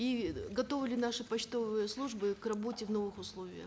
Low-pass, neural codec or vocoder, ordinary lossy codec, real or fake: none; none; none; real